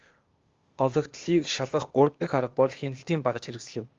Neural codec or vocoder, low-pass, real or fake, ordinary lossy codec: codec, 16 kHz, 0.8 kbps, ZipCodec; 7.2 kHz; fake; Opus, 32 kbps